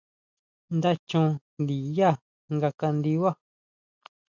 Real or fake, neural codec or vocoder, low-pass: real; none; 7.2 kHz